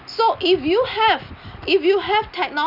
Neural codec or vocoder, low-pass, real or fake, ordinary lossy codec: none; 5.4 kHz; real; none